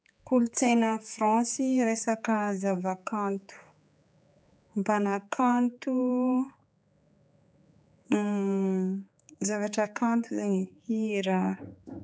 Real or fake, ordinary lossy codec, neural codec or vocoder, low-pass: fake; none; codec, 16 kHz, 4 kbps, X-Codec, HuBERT features, trained on general audio; none